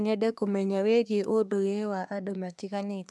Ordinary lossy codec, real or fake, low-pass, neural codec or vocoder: none; fake; none; codec, 24 kHz, 1 kbps, SNAC